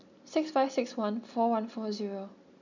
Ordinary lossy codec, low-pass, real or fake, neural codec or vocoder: none; 7.2 kHz; real; none